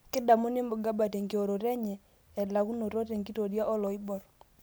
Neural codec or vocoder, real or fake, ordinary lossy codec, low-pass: none; real; none; none